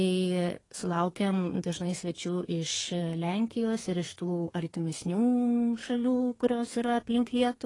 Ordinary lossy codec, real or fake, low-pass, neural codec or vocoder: AAC, 32 kbps; fake; 10.8 kHz; codec, 32 kHz, 1.9 kbps, SNAC